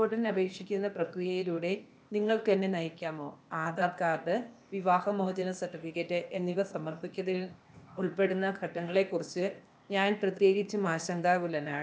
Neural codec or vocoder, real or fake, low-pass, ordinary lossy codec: codec, 16 kHz, 0.8 kbps, ZipCodec; fake; none; none